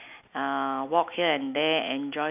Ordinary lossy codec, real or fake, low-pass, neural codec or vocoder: AAC, 32 kbps; real; 3.6 kHz; none